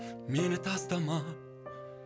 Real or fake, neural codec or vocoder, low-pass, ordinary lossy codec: real; none; none; none